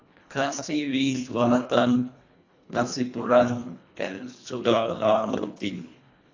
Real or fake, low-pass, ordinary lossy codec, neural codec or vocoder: fake; 7.2 kHz; AAC, 48 kbps; codec, 24 kHz, 1.5 kbps, HILCodec